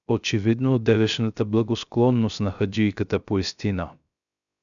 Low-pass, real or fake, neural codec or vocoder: 7.2 kHz; fake; codec, 16 kHz, 0.3 kbps, FocalCodec